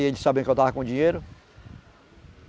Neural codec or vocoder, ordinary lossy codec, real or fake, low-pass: none; none; real; none